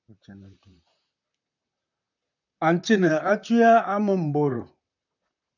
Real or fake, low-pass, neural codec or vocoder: fake; 7.2 kHz; vocoder, 44.1 kHz, 128 mel bands, Pupu-Vocoder